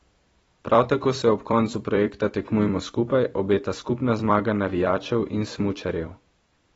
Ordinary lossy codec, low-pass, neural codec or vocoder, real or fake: AAC, 24 kbps; 19.8 kHz; vocoder, 44.1 kHz, 128 mel bands every 256 samples, BigVGAN v2; fake